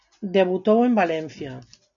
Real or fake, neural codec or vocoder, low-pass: real; none; 7.2 kHz